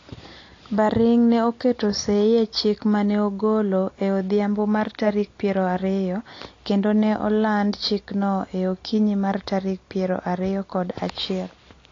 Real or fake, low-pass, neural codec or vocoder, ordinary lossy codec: real; 7.2 kHz; none; AAC, 32 kbps